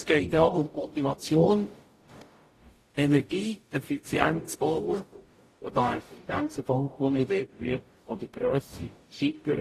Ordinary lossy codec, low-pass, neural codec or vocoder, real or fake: AAC, 48 kbps; 14.4 kHz; codec, 44.1 kHz, 0.9 kbps, DAC; fake